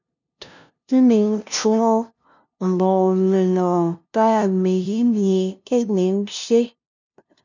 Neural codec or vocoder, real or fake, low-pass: codec, 16 kHz, 0.5 kbps, FunCodec, trained on LibriTTS, 25 frames a second; fake; 7.2 kHz